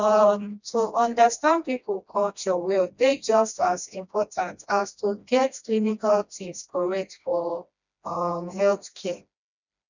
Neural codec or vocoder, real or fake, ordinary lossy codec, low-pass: codec, 16 kHz, 1 kbps, FreqCodec, smaller model; fake; none; 7.2 kHz